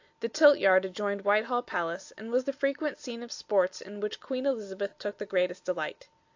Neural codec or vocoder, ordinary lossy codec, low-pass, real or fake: none; AAC, 48 kbps; 7.2 kHz; real